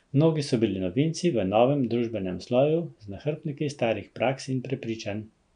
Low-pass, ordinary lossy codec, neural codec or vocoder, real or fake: 9.9 kHz; none; none; real